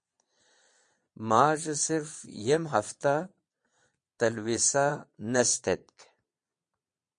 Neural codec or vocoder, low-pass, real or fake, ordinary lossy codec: vocoder, 22.05 kHz, 80 mel bands, Vocos; 9.9 kHz; fake; MP3, 48 kbps